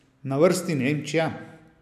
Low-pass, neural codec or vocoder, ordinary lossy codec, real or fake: 14.4 kHz; none; none; real